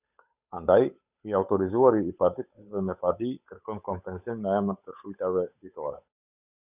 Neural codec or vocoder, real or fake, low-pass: codec, 16 kHz, 8 kbps, FunCodec, trained on Chinese and English, 25 frames a second; fake; 3.6 kHz